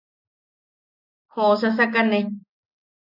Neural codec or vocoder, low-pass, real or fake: none; 5.4 kHz; real